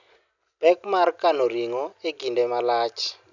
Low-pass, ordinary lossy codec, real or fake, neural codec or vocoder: 7.2 kHz; none; real; none